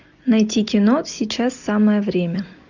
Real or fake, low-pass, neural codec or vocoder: real; 7.2 kHz; none